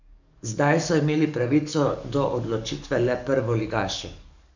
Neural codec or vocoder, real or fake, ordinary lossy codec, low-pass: codec, 44.1 kHz, 7.8 kbps, DAC; fake; none; 7.2 kHz